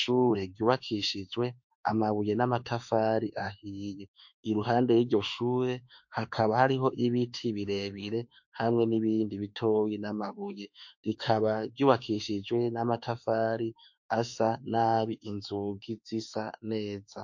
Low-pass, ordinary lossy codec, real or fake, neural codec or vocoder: 7.2 kHz; MP3, 48 kbps; fake; autoencoder, 48 kHz, 32 numbers a frame, DAC-VAE, trained on Japanese speech